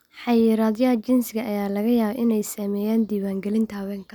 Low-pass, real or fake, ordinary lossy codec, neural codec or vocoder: none; real; none; none